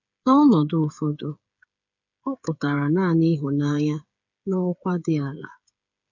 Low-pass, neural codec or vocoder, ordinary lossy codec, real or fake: 7.2 kHz; codec, 16 kHz, 8 kbps, FreqCodec, smaller model; none; fake